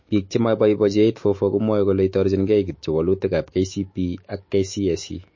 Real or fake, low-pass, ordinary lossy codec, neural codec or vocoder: fake; 7.2 kHz; MP3, 32 kbps; autoencoder, 48 kHz, 128 numbers a frame, DAC-VAE, trained on Japanese speech